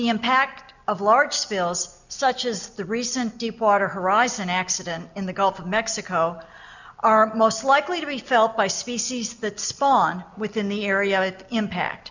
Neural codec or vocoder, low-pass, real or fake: none; 7.2 kHz; real